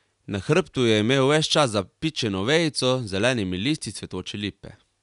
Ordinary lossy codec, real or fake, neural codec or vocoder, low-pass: none; real; none; 10.8 kHz